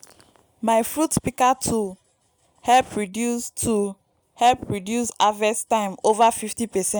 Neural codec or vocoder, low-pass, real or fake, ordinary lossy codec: none; none; real; none